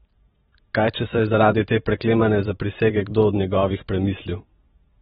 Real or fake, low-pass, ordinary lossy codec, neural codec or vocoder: fake; 19.8 kHz; AAC, 16 kbps; vocoder, 44.1 kHz, 128 mel bands every 512 samples, BigVGAN v2